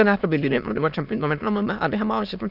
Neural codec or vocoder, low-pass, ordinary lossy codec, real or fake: autoencoder, 22.05 kHz, a latent of 192 numbers a frame, VITS, trained on many speakers; 5.4 kHz; none; fake